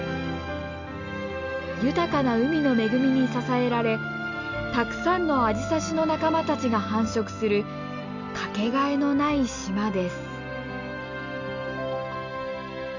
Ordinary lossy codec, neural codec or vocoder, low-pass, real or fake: none; none; 7.2 kHz; real